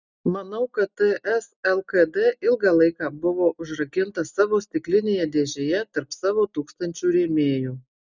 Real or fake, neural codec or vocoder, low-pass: real; none; 7.2 kHz